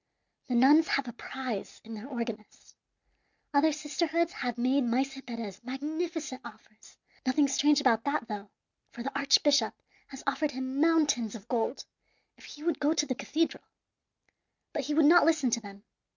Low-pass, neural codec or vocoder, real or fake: 7.2 kHz; none; real